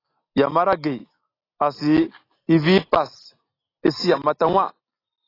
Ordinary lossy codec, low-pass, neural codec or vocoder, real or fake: AAC, 24 kbps; 5.4 kHz; none; real